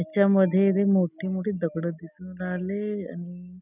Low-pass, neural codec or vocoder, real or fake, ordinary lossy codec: 3.6 kHz; none; real; none